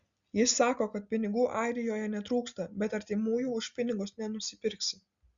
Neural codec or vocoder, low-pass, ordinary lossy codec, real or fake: none; 7.2 kHz; Opus, 64 kbps; real